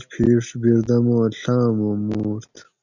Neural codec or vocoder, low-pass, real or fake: none; 7.2 kHz; real